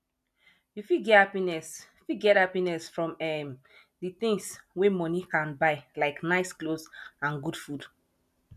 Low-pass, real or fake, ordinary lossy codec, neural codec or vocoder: 14.4 kHz; real; none; none